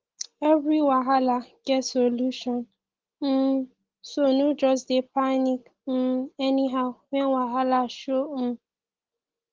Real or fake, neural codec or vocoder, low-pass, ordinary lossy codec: real; none; 7.2 kHz; Opus, 16 kbps